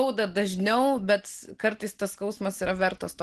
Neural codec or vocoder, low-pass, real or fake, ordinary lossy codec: none; 10.8 kHz; real; Opus, 16 kbps